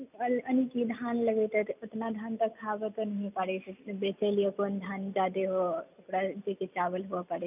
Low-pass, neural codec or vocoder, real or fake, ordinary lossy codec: 3.6 kHz; none; real; none